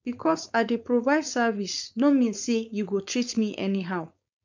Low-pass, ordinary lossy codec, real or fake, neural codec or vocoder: 7.2 kHz; MP3, 64 kbps; fake; codec, 16 kHz, 4.8 kbps, FACodec